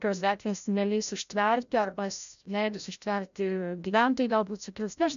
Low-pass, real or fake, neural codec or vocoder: 7.2 kHz; fake; codec, 16 kHz, 0.5 kbps, FreqCodec, larger model